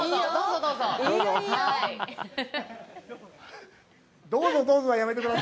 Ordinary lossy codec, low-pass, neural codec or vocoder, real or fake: none; none; none; real